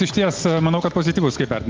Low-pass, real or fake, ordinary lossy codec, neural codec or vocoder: 7.2 kHz; real; Opus, 24 kbps; none